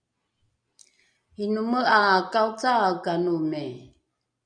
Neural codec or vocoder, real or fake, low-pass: none; real; 9.9 kHz